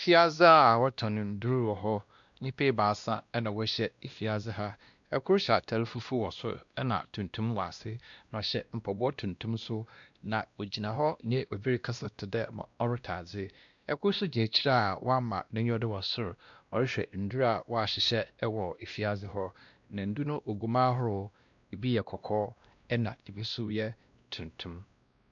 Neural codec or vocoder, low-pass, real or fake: codec, 16 kHz, 1 kbps, X-Codec, WavLM features, trained on Multilingual LibriSpeech; 7.2 kHz; fake